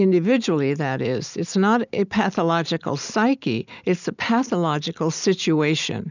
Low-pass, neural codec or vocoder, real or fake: 7.2 kHz; none; real